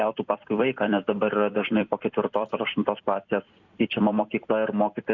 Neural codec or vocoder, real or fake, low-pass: vocoder, 44.1 kHz, 128 mel bands every 256 samples, BigVGAN v2; fake; 7.2 kHz